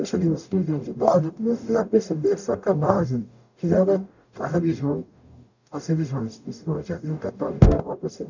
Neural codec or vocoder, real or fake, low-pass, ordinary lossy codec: codec, 44.1 kHz, 0.9 kbps, DAC; fake; 7.2 kHz; none